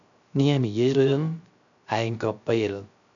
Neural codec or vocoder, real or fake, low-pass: codec, 16 kHz, 0.3 kbps, FocalCodec; fake; 7.2 kHz